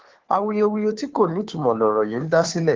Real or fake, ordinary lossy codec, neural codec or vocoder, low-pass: fake; Opus, 16 kbps; codec, 16 kHz, 2 kbps, FunCodec, trained on Chinese and English, 25 frames a second; 7.2 kHz